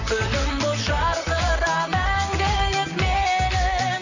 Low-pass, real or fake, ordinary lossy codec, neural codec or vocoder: 7.2 kHz; fake; none; vocoder, 44.1 kHz, 128 mel bands, Pupu-Vocoder